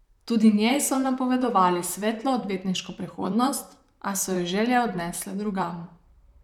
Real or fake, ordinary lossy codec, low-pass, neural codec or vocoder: fake; none; 19.8 kHz; vocoder, 44.1 kHz, 128 mel bands, Pupu-Vocoder